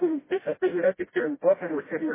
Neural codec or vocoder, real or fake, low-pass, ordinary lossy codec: codec, 16 kHz, 0.5 kbps, FreqCodec, smaller model; fake; 3.6 kHz; MP3, 16 kbps